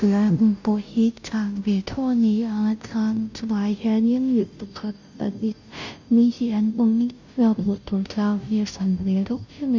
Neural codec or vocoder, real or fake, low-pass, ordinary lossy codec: codec, 16 kHz, 0.5 kbps, FunCodec, trained on Chinese and English, 25 frames a second; fake; 7.2 kHz; none